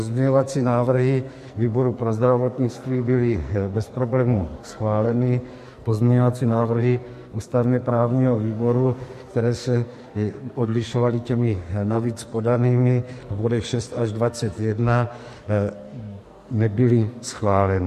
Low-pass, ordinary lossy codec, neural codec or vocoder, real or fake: 14.4 kHz; MP3, 64 kbps; codec, 44.1 kHz, 2.6 kbps, SNAC; fake